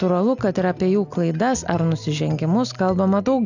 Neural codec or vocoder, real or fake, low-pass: none; real; 7.2 kHz